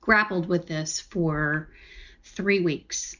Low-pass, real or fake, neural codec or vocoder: 7.2 kHz; real; none